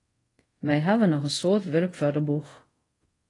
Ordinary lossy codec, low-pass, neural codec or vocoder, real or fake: AAC, 32 kbps; 10.8 kHz; codec, 24 kHz, 0.5 kbps, DualCodec; fake